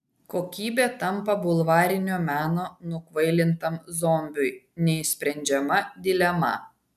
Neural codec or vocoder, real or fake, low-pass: none; real; 14.4 kHz